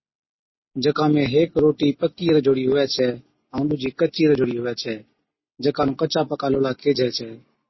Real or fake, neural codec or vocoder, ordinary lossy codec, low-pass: real; none; MP3, 24 kbps; 7.2 kHz